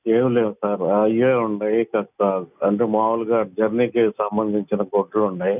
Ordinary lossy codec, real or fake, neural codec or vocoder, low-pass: none; real; none; 3.6 kHz